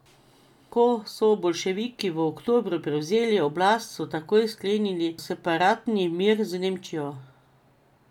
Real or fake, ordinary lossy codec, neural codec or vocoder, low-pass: real; none; none; 19.8 kHz